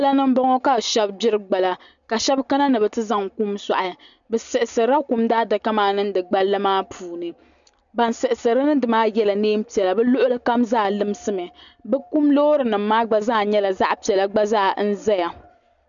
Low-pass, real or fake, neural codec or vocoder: 7.2 kHz; real; none